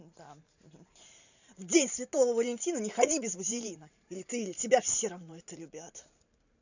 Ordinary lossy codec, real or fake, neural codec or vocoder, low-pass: none; fake; vocoder, 44.1 kHz, 128 mel bands every 512 samples, BigVGAN v2; 7.2 kHz